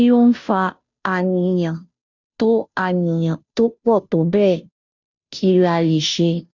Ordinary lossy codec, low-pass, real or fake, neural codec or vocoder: none; 7.2 kHz; fake; codec, 16 kHz, 0.5 kbps, FunCodec, trained on Chinese and English, 25 frames a second